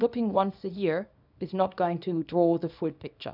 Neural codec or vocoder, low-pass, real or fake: codec, 24 kHz, 0.9 kbps, WavTokenizer, small release; 5.4 kHz; fake